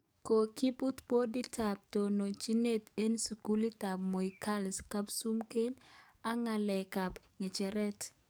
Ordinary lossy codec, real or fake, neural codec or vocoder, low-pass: none; fake; codec, 44.1 kHz, 7.8 kbps, DAC; none